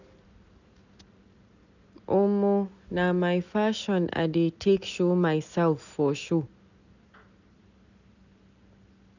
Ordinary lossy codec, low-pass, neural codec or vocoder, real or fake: none; 7.2 kHz; none; real